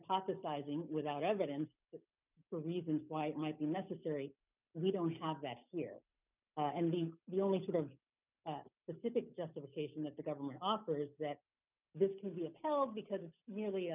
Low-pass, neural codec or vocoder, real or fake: 3.6 kHz; none; real